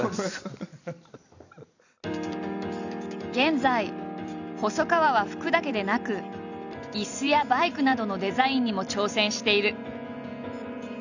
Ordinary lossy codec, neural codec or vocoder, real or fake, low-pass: none; vocoder, 44.1 kHz, 128 mel bands every 256 samples, BigVGAN v2; fake; 7.2 kHz